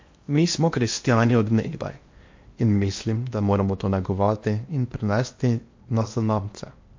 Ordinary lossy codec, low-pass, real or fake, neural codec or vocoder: MP3, 48 kbps; 7.2 kHz; fake; codec, 16 kHz in and 24 kHz out, 0.8 kbps, FocalCodec, streaming, 65536 codes